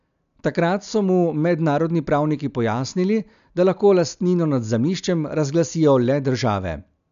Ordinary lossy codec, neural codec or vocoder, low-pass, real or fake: none; none; 7.2 kHz; real